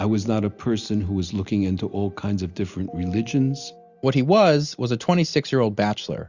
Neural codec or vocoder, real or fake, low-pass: none; real; 7.2 kHz